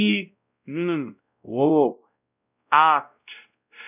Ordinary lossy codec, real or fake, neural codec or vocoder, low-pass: none; fake; codec, 16 kHz, 0.5 kbps, X-Codec, WavLM features, trained on Multilingual LibriSpeech; 3.6 kHz